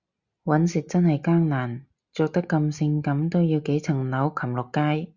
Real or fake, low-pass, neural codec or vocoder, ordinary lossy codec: real; 7.2 kHz; none; Opus, 64 kbps